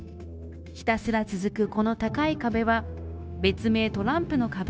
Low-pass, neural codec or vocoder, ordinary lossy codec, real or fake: none; codec, 16 kHz, 0.9 kbps, LongCat-Audio-Codec; none; fake